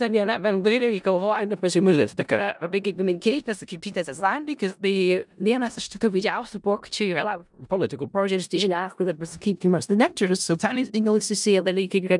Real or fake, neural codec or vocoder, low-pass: fake; codec, 16 kHz in and 24 kHz out, 0.4 kbps, LongCat-Audio-Codec, four codebook decoder; 10.8 kHz